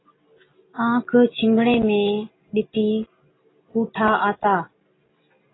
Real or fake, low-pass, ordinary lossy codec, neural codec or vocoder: real; 7.2 kHz; AAC, 16 kbps; none